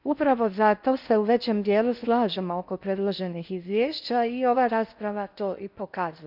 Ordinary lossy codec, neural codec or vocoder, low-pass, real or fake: none; codec, 16 kHz in and 24 kHz out, 0.6 kbps, FocalCodec, streaming, 4096 codes; 5.4 kHz; fake